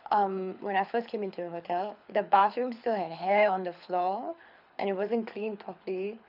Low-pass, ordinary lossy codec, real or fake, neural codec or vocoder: 5.4 kHz; none; fake; codec, 24 kHz, 6 kbps, HILCodec